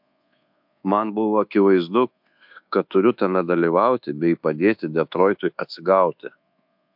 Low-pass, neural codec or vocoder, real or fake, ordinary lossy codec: 5.4 kHz; codec, 24 kHz, 1.2 kbps, DualCodec; fake; MP3, 48 kbps